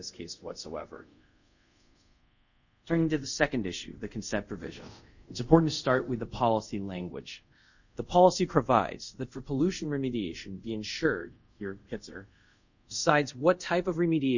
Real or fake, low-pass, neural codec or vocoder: fake; 7.2 kHz; codec, 24 kHz, 0.5 kbps, DualCodec